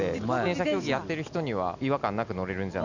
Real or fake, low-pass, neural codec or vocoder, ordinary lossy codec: real; 7.2 kHz; none; none